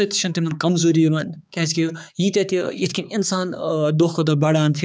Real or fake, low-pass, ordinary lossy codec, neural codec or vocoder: fake; none; none; codec, 16 kHz, 4 kbps, X-Codec, HuBERT features, trained on balanced general audio